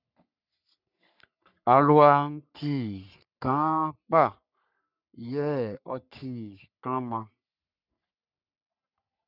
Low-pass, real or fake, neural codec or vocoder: 5.4 kHz; fake; codec, 44.1 kHz, 3.4 kbps, Pupu-Codec